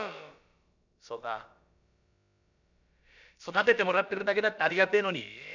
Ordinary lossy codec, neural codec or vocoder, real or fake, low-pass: none; codec, 16 kHz, about 1 kbps, DyCAST, with the encoder's durations; fake; 7.2 kHz